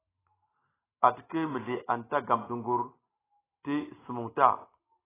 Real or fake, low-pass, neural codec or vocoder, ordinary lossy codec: real; 3.6 kHz; none; AAC, 16 kbps